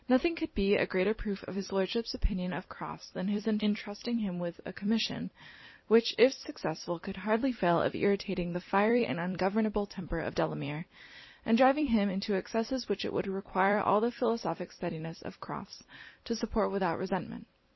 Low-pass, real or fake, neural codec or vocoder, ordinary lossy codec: 7.2 kHz; fake; vocoder, 44.1 kHz, 128 mel bands every 256 samples, BigVGAN v2; MP3, 24 kbps